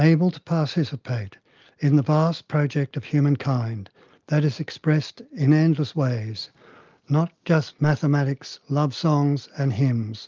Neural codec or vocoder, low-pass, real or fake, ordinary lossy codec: none; 7.2 kHz; real; Opus, 24 kbps